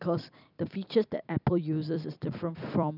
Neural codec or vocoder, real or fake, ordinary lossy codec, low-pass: vocoder, 44.1 kHz, 128 mel bands every 256 samples, BigVGAN v2; fake; none; 5.4 kHz